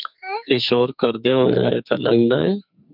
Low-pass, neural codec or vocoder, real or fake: 5.4 kHz; codec, 44.1 kHz, 2.6 kbps, SNAC; fake